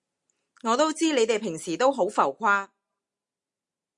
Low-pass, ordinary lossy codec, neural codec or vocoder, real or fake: 10.8 kHz; Opus, 64 kbps; none; real